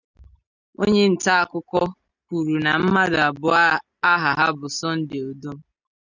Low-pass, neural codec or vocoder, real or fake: 7.2 kHz; none; real